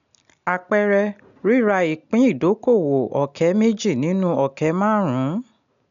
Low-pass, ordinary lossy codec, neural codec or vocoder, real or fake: 7.2 kHz; none; none; real